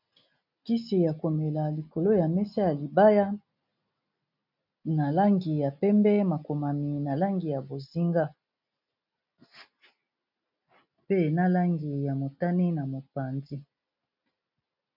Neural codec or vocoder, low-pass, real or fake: none; 5.4 kHz; real